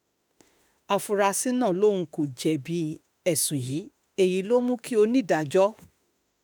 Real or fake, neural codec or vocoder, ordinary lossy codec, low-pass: fake; autoencoder, 48 kHz, 32 numbers a frame, DAC-VAE, trained on Japanese speech; none; none